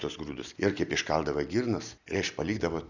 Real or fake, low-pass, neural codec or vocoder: real; 7.2 kHz; none